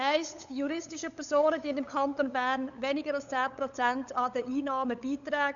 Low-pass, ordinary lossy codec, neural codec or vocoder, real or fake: 7.2 kHz; none; codec, 16 kHz, 8 kbps, FunCodec, trained on LibriTTS, 25 frames a second; fake